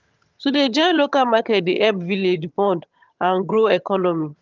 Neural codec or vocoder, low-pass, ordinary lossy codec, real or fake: vocoder, 22.05 kHz, 80 mel bands, HiFi-GAN; 7.2 kHz; Opus, 24 kbps; fake